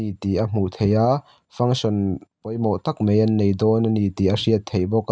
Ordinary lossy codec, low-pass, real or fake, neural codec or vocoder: none; none; real; none